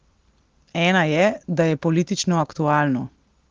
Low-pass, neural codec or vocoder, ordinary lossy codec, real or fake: 7.2 kHz; none; Opus, 16 kbps; real